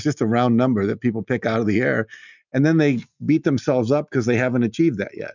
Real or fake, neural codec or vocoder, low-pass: real; none; 7.2 kHz